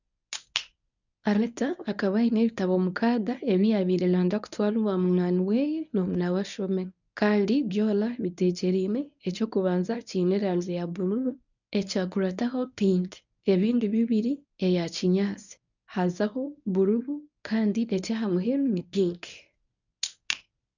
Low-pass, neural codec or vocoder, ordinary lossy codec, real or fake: 7.2 kHz; codec, 24 kHz, 0.9 kbps, WavTokenizer, medium speech release version 1; none; fake